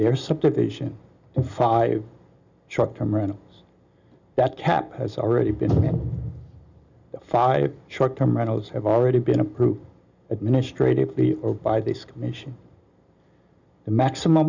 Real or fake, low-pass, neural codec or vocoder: real; 7.2 kHz; none